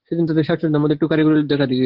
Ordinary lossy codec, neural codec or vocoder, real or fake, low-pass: Opus, 16 kbps; none; real; 5.4 kHz